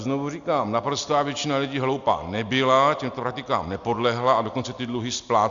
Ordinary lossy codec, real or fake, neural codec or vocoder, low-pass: Opus, 64 kbps; real; none; 7.2 kHz